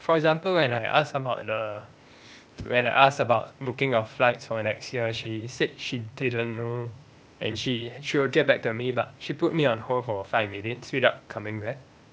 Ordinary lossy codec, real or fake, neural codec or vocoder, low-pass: none; fake; codec, 16 kHz, 0.8 kbps, ZipCodec; none